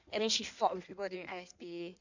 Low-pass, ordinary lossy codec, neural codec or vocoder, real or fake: 7.2 kHz; none; codec, 16 kHz in and 24 kHz out, 1.1 kbps, FireRedTTS-2 codec; fake